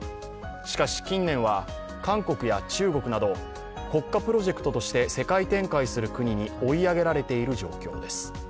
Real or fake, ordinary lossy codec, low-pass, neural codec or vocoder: real; none; none; none